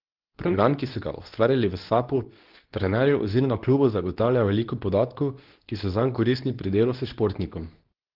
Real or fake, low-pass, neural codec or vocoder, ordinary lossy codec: fake; 5.4 kHz; codec, 24 kHz, 0.9 kbps, WavTokenizer, medium speech release version 2; Opus, 16 kbps